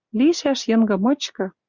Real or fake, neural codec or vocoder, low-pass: real; none; 7.2 kHz